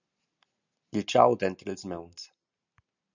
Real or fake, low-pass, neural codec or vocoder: real; 7.2 kHz; none